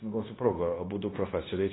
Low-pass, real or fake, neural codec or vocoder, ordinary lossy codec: 7.2 kHz; fake; codec, 16 kHz, 0.9 kbps, LongCat-Audio-Codec; AAC, 16 kbps